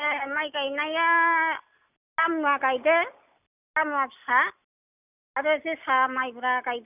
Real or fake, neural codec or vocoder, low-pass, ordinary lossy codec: real; none; 3.6 kHz; none